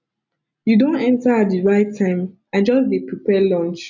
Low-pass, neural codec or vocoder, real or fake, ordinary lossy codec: 7.2 kHz; none; real; none